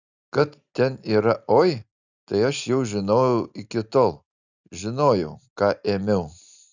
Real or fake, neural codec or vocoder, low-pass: real; none; 7.2 kHz